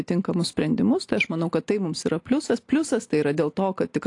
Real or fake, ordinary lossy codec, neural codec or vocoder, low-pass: real; AAC, 48 kbps; none; 10.8 kHz